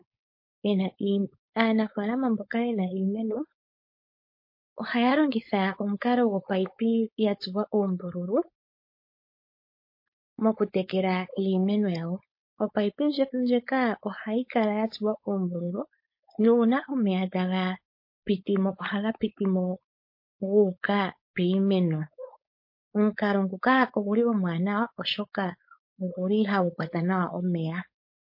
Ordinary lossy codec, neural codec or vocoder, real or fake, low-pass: MP3, 32 kbps; codec, 16 kHz, 4.8 kbps, FACodec; fake; 5.4 kHz